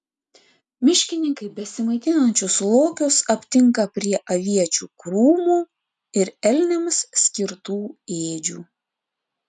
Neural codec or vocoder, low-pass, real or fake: none; 10.8 kHz; real